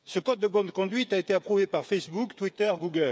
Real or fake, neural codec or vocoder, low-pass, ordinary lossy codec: fake; codec, 16 kHz, 8 kbps, FreqCodec, smaller model; none; none